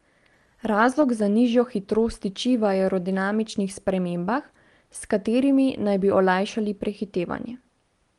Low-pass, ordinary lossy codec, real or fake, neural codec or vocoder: 10.8 kHz; Opus, 24 kbps; real; none